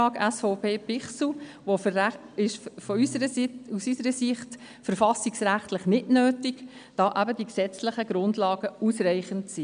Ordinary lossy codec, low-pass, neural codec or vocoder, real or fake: none; 9.9 kHz; none; real